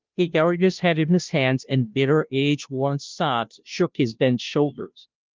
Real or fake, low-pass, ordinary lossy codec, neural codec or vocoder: fake; 7.2 kHz; Opus, 32 kbps; codec, 16 kHz, 0.5 kbps, FunCodec, trained on Chinese and English, 25 frames a second